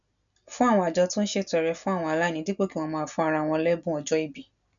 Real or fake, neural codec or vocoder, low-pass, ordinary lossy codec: real; none; 7.2 kHz; none